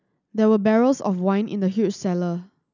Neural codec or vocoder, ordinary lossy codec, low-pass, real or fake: none; none; 7.2 kHz; real